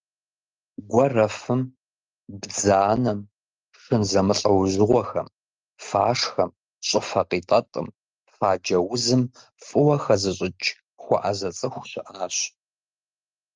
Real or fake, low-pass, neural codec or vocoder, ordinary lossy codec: real; 7.2 kHz; none; Opus, 32 kbps